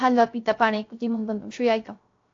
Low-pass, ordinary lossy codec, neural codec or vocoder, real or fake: 7.2 kHz; AAC, 48 kbps; codec, 16 kHz, 0.3 kbps, FocalCodec; fake